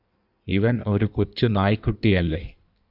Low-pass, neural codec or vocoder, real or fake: 5.4 kHz; codec, 16 kHz in and 24 kHz out, 1.1 kbps, FireRedTTS-2 codec; fake